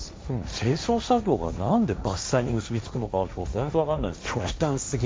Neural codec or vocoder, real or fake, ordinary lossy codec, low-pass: codec, 16 kHz, 1.1 kbps, Voila-Tokenizer; fake; none; none